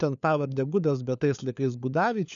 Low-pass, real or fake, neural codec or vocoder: 7.2 kHz; fake; codec, 16 kHz, 4 kbps, FunCodec, trained on LibriTTS, 50 frames a second